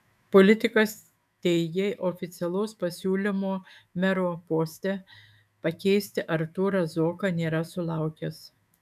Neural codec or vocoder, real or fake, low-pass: autoencoder, 48 kHz, 128 numbers a frame, DAC-VAE, trained on Japanese speech; fake; 14.4 kHz